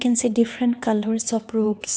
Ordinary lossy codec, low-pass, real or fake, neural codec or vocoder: none; none; fake; codec, 16 kHz, 2 kbps, X-Codec, HuBERT features, trained on LibriSpeech